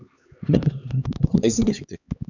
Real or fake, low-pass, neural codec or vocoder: fake; 7.2 kHz; codec, 16 kHz, 2 kbps, X-Codec, HuBERT features, trained on LibriSpeech